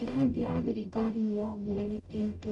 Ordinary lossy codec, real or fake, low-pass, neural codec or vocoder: none; fake; 10.8 kHz; codec, 44.1 kHz, 0.9 kbps, DAC